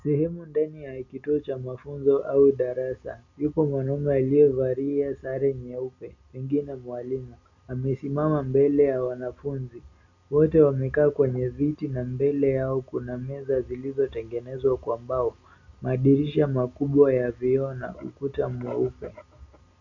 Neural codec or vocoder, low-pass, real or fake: none; 7.2 kHz; real